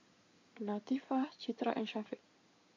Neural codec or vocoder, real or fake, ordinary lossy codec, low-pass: codec, 16 kHz in and 24 kHz out, 2.2 kbps, FireRedTTS-2 codec; fake; MP3, 48 kbps; 7.2 kHz